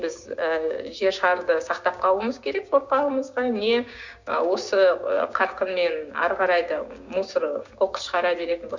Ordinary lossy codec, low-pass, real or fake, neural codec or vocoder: Opus, 64 kbps; 7.2 kHz; fake; codec, 16 kHz, 6 kbps, DAC